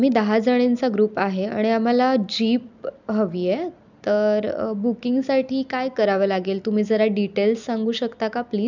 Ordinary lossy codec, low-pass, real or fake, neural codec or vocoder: none; 7.2 kHz; real; none